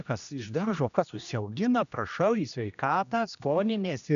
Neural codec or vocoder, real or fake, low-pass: codec, 16 kHz, 1 kbps, X-Codec, HuBERT features, trained on general audio; fake; 7.2 kHz